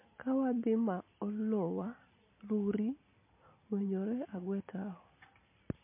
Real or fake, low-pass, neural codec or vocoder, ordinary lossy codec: real; 3.6 kHz; none; MP3, 24 kbps